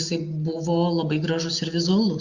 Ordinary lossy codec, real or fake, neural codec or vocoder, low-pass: Opus, 64 kbps; real; none; 7.2 kHz